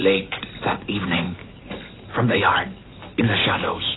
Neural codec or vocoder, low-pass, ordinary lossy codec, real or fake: none; 7.2 kHz; AAC, 16 kbps; real